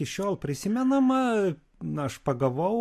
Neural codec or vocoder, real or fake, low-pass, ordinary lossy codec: none; real; 14.4 kHz; MP3, 64 kbps